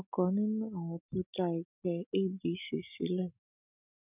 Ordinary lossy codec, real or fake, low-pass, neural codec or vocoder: none; real; 3.6 kHz; none